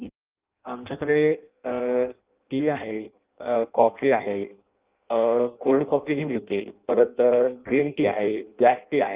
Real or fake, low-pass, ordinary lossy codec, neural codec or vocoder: fake; 3.6 kHz; Opus, 32 kbps; codec, 16 kHz in and 24 kHz out, 0.6 kbps, FireRedTTS-2 codec